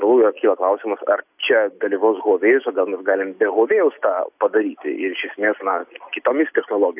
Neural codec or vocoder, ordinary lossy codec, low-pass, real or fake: none; AAC, 32 kbps; 3.6 kHz; real